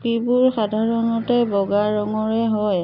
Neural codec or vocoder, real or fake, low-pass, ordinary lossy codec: none; real; 5.4 kHz; none